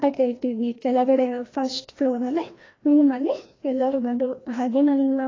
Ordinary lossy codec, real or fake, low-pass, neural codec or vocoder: AAC, 32 kbps; fake; 7.2 kHz; codec, 16 kHz, 1 kbps, FreqCodec, larger model